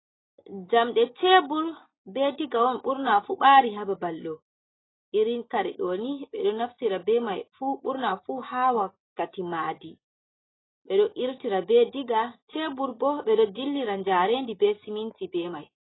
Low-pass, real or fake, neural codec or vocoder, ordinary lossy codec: 7.2 kHz; real; none; AAC, 16 kbps